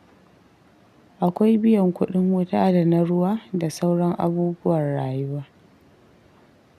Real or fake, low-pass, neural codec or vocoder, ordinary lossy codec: real; 14.4 kHz; none; none